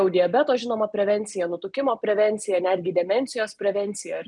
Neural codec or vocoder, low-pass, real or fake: none; 10.8 kHz; real